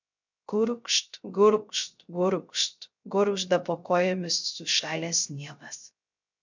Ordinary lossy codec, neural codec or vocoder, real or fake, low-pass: MP3, 64 kbps; codec, 16 kHz, 0.3 kbps, FocalCodec; fake; 7.2 kHz